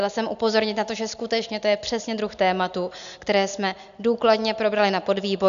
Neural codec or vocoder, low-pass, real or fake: none; 7.2 kHz; real